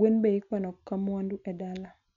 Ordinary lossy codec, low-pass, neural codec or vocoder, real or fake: none; 7.2 kHz; none; real